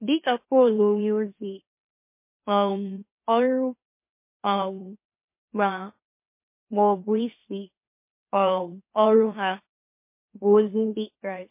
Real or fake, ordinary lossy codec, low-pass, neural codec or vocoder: fake; MP3, 24 kbps; 3.6 kHz; autoencoder, 44.1 kHz, a latent of 192 numbers a frame, MeloTTS